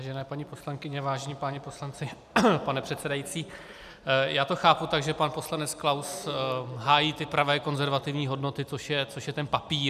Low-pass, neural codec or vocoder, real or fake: 14.4 kHz; none; real